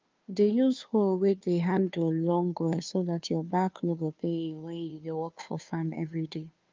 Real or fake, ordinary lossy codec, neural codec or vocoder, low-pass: fake; Opus, 24 kbps; codec, 16 kHz, 2 kbps, FunCodec, trained on Chinese and English, 25 frames a second; 7.2 kHz